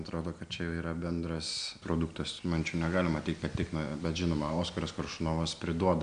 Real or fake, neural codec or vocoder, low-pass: real; none; 9.9 kHz